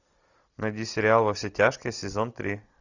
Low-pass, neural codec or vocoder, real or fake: 7.2 kHz; none; real